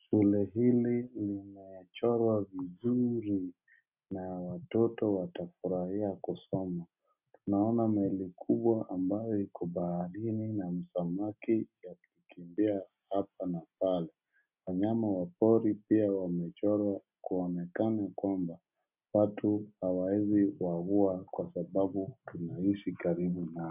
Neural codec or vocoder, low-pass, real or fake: none; 3.6 kHz; real